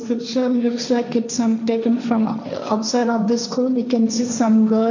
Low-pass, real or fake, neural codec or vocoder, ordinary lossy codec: 7.2 kHz; fake; codec, 16 kHz, 1.1 kbps, Voila-Tokenizer; none